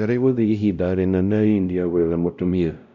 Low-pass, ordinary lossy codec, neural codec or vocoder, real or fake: 7.2 kHz; none; codec, 16 kHz, 0.5 kbps, X-Codec, WavLM features, trained on Multilingual LibriSpeech; fake